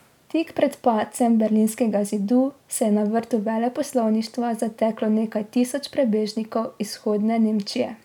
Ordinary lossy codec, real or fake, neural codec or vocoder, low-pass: none; real; none; 19.8 kHz